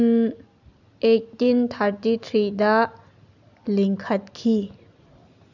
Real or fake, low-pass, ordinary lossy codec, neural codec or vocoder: real; 7.2 kHz; none; none